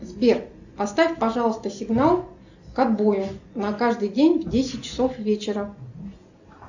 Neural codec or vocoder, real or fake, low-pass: none; real; 7.2 kHz